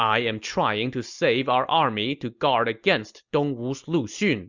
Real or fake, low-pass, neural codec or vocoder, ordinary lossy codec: real; 7.2 kHz; none; Opus, 64 kbps